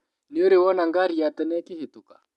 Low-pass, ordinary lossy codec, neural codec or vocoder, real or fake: none; none; none; real